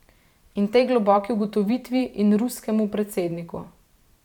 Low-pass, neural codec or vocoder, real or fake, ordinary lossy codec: 19.8 kHz; vocoder, 44.1 kHz, 128 mel bands every 512 samples, BigVGAN v2; fake; none